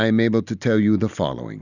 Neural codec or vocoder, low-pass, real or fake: none; 7.2 kHz; real